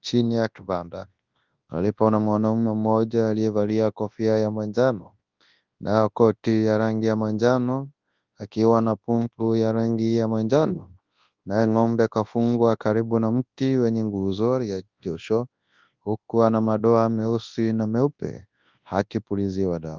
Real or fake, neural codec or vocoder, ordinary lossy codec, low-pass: fake; codec, 24 kHz, 0.9 kbps, WavTokenizer, large speech release; Opus, 32 kbps; 7.2 kHz